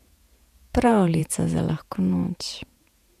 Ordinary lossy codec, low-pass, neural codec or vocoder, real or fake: none; 14.4 kHz; vocoder, 48 kHz, 128 mel bands, Vocos; fake